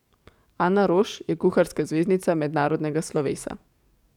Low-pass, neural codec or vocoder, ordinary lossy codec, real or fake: 19.8 kHz; codec, 44.1 kHz, 7.8 kbps, DAC; none; fake